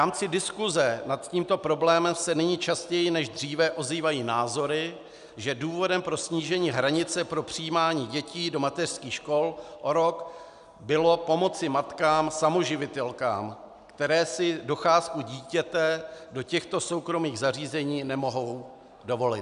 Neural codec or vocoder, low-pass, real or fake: none; 10.8 kHz; real